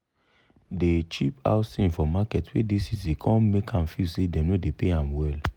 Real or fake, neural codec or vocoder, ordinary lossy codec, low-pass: real; none; none; 19.8 kHz